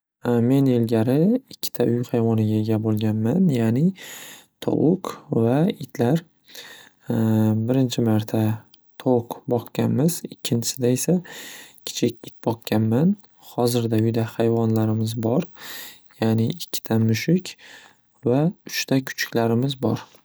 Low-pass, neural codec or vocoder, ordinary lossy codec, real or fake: none; none; none; real